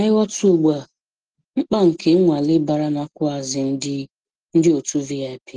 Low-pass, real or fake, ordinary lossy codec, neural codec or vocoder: 9.9 kHz; real; Opus, 16 kbps; none